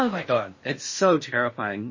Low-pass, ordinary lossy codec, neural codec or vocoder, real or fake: 7.2 kHz; MP3, 32 kbps; codec, 16 kHz in and 24 kHz out, 0.6 kbps, FocalCodec, streaming, 4096 codes; fake